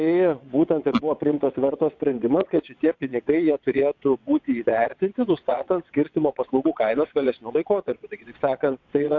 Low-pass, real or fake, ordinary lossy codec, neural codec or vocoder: 7.2 kHz; fake; AAC, 48 kbps; vocoder, 22.05 kHz, 80 mel bands, WaveNeXt